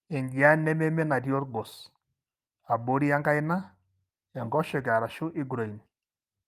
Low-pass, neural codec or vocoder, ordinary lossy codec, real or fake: 14.4 kHz; none; Opus, 32 kbps; real